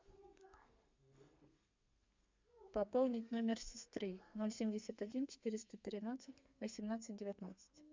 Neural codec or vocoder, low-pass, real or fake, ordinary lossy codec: codec, 32 kHz, 1.9 kbps, SNAC; 7.2 kHz; fake; MP3, 64 kbps